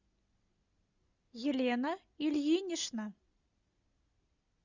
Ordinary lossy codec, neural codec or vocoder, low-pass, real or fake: Opus, 64 kbps; none; 7.2 kHz; real